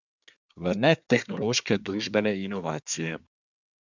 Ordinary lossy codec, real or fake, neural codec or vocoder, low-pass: none; fake; codec, 24 kHz, 1 kbps, SNAC; 7.2 kHz